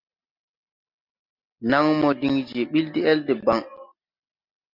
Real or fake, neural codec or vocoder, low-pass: real; none; 5.4 kHz